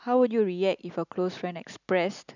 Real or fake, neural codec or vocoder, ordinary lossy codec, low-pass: real; none; none; 7.2 kHz